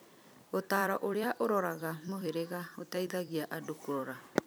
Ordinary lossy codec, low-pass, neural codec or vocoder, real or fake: none; none; vocoder, 44.1 kHz, 128 mel bands every 256 samples, BigVGAN v2; fake